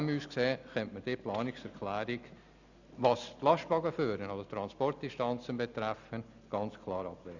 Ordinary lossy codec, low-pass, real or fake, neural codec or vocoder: none; 7.2 kHz; real; none